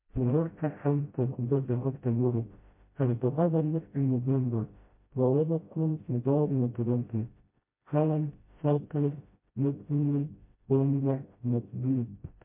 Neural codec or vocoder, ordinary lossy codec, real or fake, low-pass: codec, 16 kHz, 0.5 kbps, FreqCodec, smaller model; MP3, 24 kbps; fake; 3.6 kHz